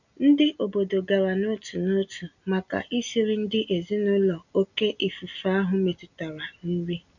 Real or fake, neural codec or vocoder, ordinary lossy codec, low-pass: real; none; none; 7.2 kHz